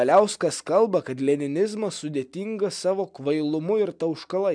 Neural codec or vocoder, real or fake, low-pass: none; real; 9.9 kHz